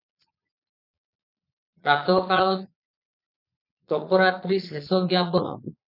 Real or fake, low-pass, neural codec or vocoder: fake; 5.4 kHz; vocoder, 22.05 kHz, 80 mel bands, Vocos